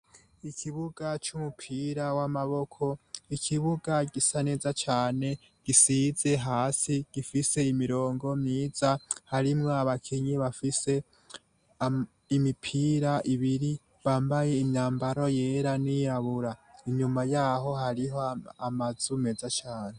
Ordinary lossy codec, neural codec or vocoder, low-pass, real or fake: Opus, 64 kbps; none; 9.9 kHz; real